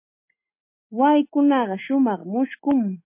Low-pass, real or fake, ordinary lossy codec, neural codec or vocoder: 3.6 kHz; real; MP3, 24 kbps; none